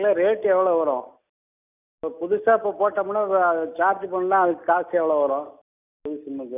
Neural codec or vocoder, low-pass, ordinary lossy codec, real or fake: none; 3.6 kHz; none; real